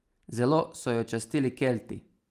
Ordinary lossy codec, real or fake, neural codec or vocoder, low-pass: Opus, 32 kbps; real; none; 14.4 kHz